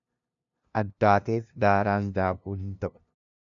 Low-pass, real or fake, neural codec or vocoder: 7.2 kHz; fake; codec, 16 kHz, 0.5 kbps, FunCodec, trained on LibriTTS, 25 frames a second